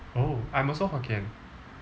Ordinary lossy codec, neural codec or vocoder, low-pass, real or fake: none; none; none; real